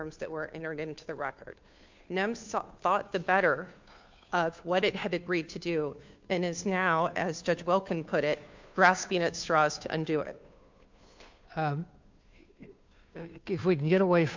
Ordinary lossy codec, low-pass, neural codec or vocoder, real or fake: MP3, 64 kbps; 7.2 kHz; codec, 16 kHz, 2 kbps, FunCodec, trained on Chinese and English, 25 frames a second; fake